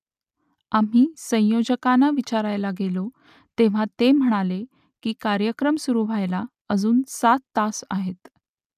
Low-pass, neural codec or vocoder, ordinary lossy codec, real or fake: 14.4 kHz; none; none; real